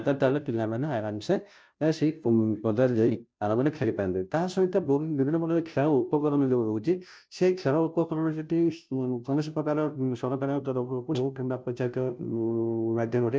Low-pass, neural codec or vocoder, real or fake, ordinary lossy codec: none; codec, 16 kHz, 0.5 kbps, FunCodec, trained on Chinese and English, 25 frames a second; fake; none